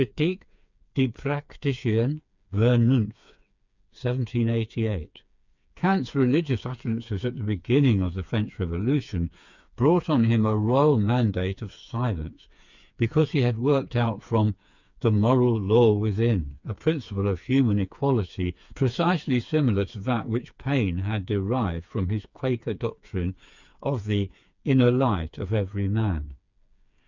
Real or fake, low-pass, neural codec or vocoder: fake; 7.2 kHz; codec, 16 kHz, 4 kbps, FreqCodec, smaller model